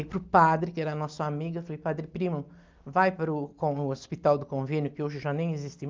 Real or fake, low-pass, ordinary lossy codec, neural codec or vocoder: real; 7.2 kHz; Opus, 24 kbps; none